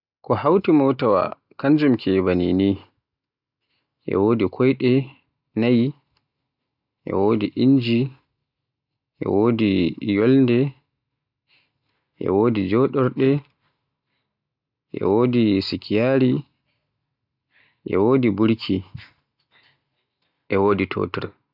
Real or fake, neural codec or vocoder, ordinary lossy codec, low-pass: real; none; none; 5.4 kHz